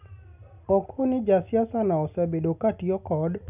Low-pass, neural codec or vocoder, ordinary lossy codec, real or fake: 3.6 kHz; none; none; real